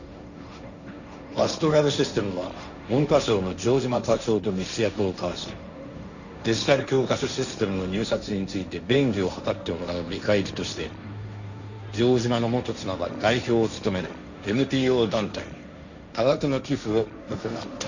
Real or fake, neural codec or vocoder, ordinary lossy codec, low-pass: fake; codec, 16 kHz, 1.1 kbps, Voila-Tokenizer; none; 7.2 kHz